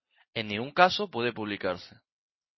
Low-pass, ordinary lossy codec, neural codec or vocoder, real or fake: 7.2 kHz; MP3, 24 kbps; none; real